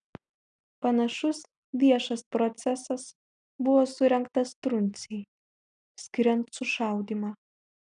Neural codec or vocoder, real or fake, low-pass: none; real; 9.9 kHz